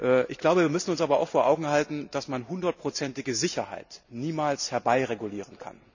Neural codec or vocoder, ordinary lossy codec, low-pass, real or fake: none; none; 7.2 kHz; real